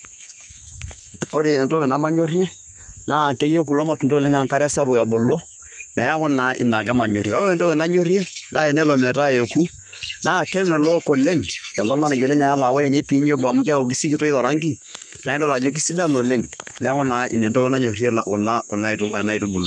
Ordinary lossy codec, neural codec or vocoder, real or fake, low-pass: none; codec, 32 kHz, 1.9 kbps, SNAC; fake; 10.8 kHz